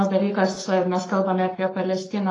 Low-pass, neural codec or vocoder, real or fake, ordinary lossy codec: 10.8 kHz; codec, 44.1 kHz, 7.8 kbps, Pupu-Codec; fake; AAC, 32 kbps